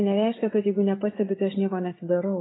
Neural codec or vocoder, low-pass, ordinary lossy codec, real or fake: none; 7.2 kHz; AAC, 16 kbps; real